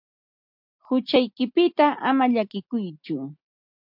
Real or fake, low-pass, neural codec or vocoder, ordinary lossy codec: real; 5.4 kHz; none; MP3, 48 kbps